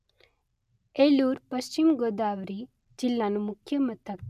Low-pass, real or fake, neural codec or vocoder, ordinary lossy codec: 14.4 kHz; real; none; none